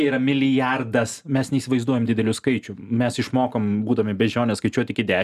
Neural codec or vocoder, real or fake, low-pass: none; real; 14.4 kHz